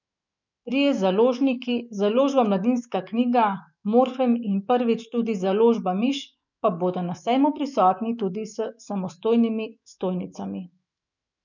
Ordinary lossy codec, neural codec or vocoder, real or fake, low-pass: none; autoencoder, 48 kHz, 128 numbers a frame, DAC-VAE, trained on Japanese speech; fake; 7.2 kHz